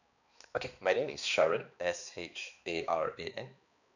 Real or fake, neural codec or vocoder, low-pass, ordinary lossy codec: fake; codec, 16 kHz, 2 kbps, X-Codec, HuBERT features, trained on balanced general audio; 7.2 kHz; none